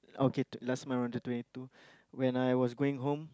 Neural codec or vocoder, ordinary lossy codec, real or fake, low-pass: none; none; real; none